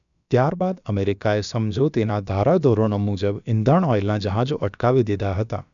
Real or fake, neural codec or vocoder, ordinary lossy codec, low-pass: fake; codec, 16 kHz, about 1 kbps, DyCAST, with the encoder's durations; none; 7.2 kHz